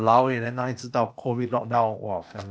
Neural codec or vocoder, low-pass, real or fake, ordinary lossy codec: codec, 16 kHz, 0.8 kbps, ZipCodec; none; fake; none